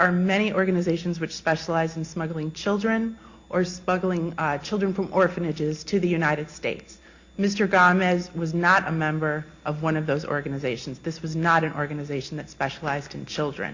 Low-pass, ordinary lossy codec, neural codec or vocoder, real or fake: 7.2 kHz; Opus, 64 kbps; none; real